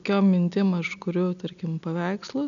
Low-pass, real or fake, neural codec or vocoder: 7.2 kHz; real; none